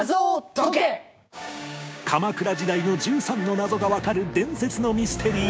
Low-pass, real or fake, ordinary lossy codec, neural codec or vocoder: none; fake; none; codec, 16 kHz, 6 kbps, DAC